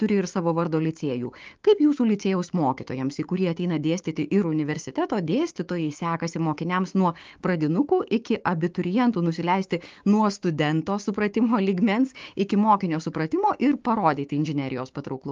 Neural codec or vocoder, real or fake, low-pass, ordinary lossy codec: codec, 16 kHz, 6 kbps, DAC; fake; 7.2 kHz; Opus, 32 kbps